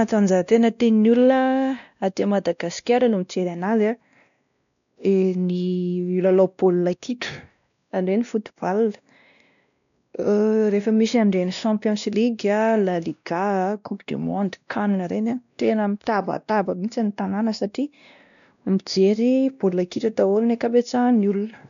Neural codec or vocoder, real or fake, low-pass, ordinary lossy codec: codec, 16 kHz, 1 kbps, X-Codec, WavLM features, trained on Multilingual LibriSpeech; fake; 7.2 kHz; none